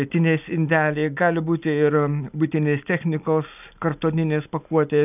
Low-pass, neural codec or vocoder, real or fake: 3.6 kHz; none; real